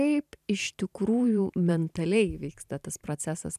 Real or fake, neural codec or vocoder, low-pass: fake; vocoder, 44.1 kHz, 128 mel bands every 512 samples, BigVGAN v2; 14.4 kHz